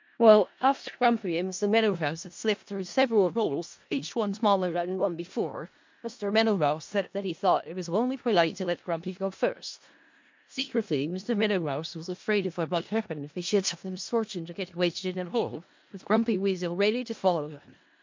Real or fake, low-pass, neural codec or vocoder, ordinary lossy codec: fake; 7.2 kHz; codec, 16 kHz in and 24 kHz out, 0.4 kbps, LongCat-Audio-Codec, four codebook decoder; MP3, 48 kbps